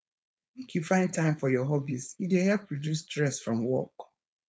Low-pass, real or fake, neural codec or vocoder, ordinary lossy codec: none; fake; codec, 16 kHz, 4.8 kbps, FACodec; none